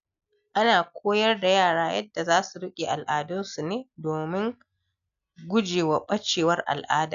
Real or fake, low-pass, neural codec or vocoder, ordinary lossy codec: real; 7.2 kHz; none; none